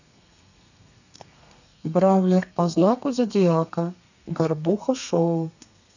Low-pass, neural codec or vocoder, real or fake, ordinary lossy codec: 7.2 kHz; codec, 32 kHz, 1.9 kbps, SNAC; fake; none